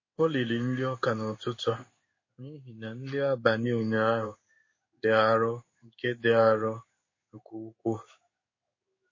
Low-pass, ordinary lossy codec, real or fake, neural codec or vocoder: 7.2 kHz; MP3, 32 kbps; fake; codec, 16 kHz in and 24 kHz out, 1 kbps, XY-Tokenizer